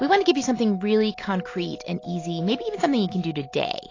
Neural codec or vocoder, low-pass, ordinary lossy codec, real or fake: none; 7.2 kHz; AAC, 32 kbps; real